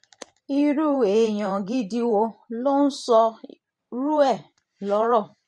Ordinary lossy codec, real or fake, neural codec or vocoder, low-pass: MP3, 48 kbps; fake; vocoder, 44.1 kHz, 128 mel bands every 512 samples, BigVGAN v2; 10.8 kHz